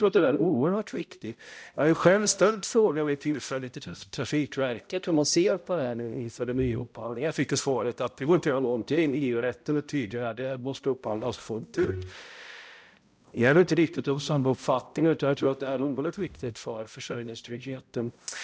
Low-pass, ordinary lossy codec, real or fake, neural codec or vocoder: none; none; fake; codec, 16 kHz, 0.5 kbps, X-Codec, HuBERT features, trained on balanced general audio